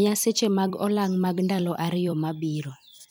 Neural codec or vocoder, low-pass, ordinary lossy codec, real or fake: vocoder, 44.1 kHz, 128 mel bands every 256 samples, BigVGAN v2; none; none; fake